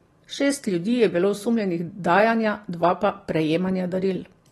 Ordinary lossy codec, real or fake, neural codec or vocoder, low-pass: AAC, 32 kbps; real; none; 19.8 kHz